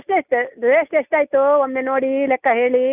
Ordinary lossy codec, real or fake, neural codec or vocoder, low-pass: none; real; none; 3.6 kHz